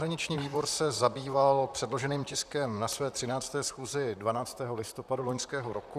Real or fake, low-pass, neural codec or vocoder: fake; 14.4 kHz; vocoder, 44.1 kHz, 128 mel bands, Pupu-Vocoder